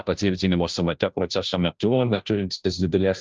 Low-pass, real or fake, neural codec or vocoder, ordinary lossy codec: 7.2 kHz; fake; codec, 16 kHz, 0.5 kbps, FunCodec, trained on Chinese and English, 25 frames a second; Opus, 24 kbps